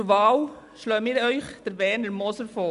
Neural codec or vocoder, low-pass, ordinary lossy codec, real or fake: none; 10.8 kHz; none; real